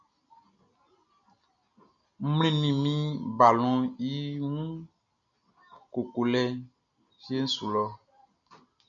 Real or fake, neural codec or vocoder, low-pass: real; none; 7.2 kHz